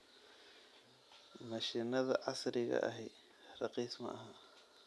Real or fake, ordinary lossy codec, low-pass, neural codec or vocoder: real; none; none; none